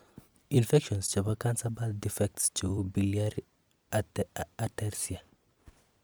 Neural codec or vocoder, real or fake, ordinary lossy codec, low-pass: vocoder, 44.1 kHz, 128 mel bands every 256 samples, BigVGAN v2; fake; none; none